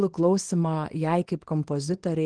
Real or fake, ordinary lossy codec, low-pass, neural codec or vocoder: fake; Opus, 16 kbps; 9.9 kHz; codec, 24 kHz, 0.9 kbps, WavTokenizer, small release